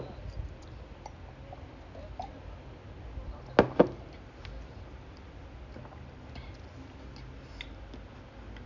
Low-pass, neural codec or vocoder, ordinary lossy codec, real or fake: 7.2 kHz; none; none; real